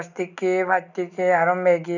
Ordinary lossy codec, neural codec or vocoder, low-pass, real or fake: none; none; 7.2 kHz; real